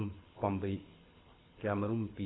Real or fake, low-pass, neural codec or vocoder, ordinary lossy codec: fake; 7.2 kHz; codec, 24 kHz, 6 kbps, HILCodec; AAC, 16 kbps